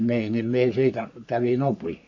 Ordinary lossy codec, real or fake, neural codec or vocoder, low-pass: AAC, 48 kbps; fake; codec, 44.1 kHz, 3.4 kbps, Pupu-Codec; 7.2 kHz